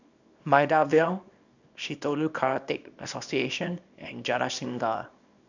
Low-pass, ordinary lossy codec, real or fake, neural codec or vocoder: 7.2 kHz; none; fake; codec, 24 kHz, 0.9 kbps, WavTokenizer, small release